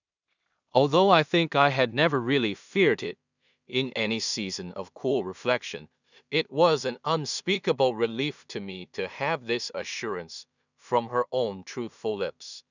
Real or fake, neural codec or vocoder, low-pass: fake; codec, 16 kHz in and 24 kHz out, 0.4 kbps, LongCat-Audio-Codec, two codebook decoder; 7.2 kHz